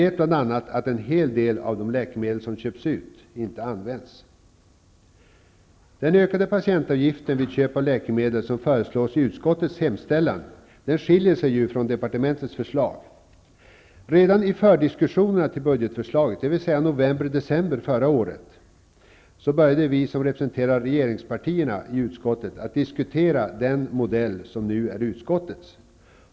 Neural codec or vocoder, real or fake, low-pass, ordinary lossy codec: none; real; none; none